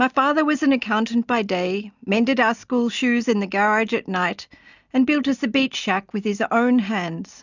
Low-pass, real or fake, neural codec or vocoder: 7.2 kHz; real; none